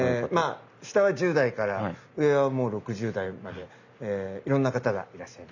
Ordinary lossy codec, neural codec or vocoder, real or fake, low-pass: none; none; real; 7.2 kHz